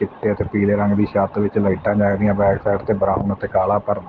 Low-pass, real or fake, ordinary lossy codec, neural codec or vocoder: 7.2 kHz; real; Opus, 16 kbps; none